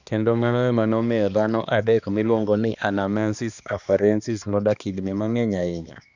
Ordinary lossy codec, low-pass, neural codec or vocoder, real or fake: none; 7.2 kHz; codec, 16 kHz, 2 kbps, X-Codec, HuBERT features, trained on balanced general audio; fake